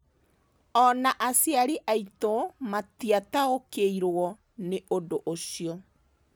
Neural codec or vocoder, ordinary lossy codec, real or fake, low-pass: none; none; real; none